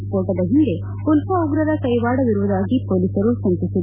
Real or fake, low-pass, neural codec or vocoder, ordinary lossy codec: real; 3.6 kHz; none; none